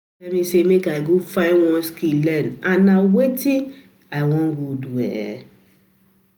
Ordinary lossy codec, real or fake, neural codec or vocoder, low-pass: none; real; none; none